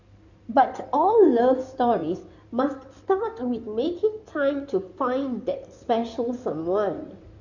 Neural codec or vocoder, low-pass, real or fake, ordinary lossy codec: codec, 16 kHz in and 24 kHz out, 2.2 kbps, FireRedTTS-2 codec; 7.2 kHz; fake; none